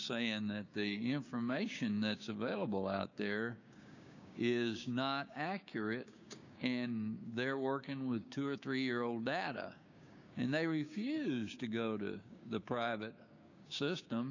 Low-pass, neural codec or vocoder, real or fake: 7.2 kHz; codec, 16 kHz, 6 kbps, DAC; fake